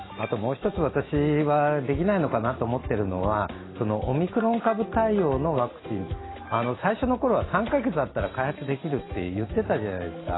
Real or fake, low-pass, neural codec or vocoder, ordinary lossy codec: real; 7.2 kHz; none; AAC, 16 kbps